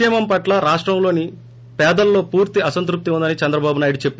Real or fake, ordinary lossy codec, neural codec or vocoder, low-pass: real; none; none; none